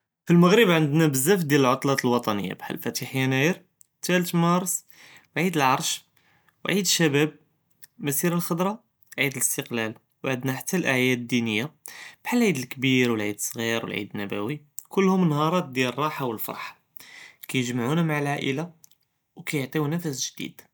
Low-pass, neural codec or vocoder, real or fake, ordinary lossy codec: none; none; real; none